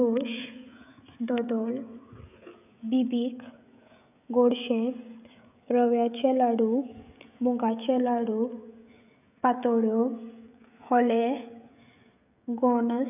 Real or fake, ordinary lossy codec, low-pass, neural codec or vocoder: fake; none; 3.6 kHz; codec, 16 kHz, 16 kbps, FreqCodec, smaller model